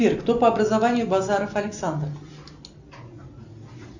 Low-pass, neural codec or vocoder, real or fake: 7.2 kHz; none; real